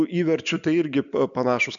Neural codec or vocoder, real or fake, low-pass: none; real; 7.2 kHz